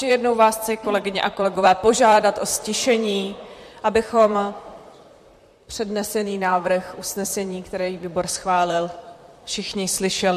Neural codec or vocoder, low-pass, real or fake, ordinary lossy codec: vocoder, 44.1 kHz, 128 mel bands, Pupu-Vocoder; 14.4 kHz; fake; MP3, 64 kbps